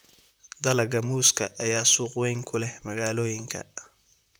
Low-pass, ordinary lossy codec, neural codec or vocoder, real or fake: none; none; vocoder, 44.1 kHz, 128 mel bands, Pupu-Vocoder; fake